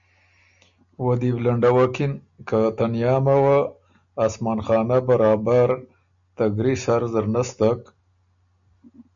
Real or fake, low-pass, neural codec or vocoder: real; 7.2 kHz; none